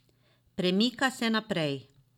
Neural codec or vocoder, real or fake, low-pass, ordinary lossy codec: none; real; 19.8 kHz; none